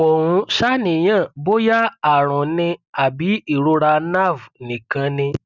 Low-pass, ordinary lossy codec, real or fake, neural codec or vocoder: 7.2 kHz; none; real; none